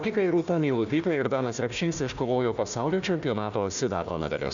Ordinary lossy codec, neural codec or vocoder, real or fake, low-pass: MP3, 96 kbps; codec, 16 kHz, 1 kbps, FunCodec, trained on Chinese and English, 50 frames a second; fake; 7.2 kHz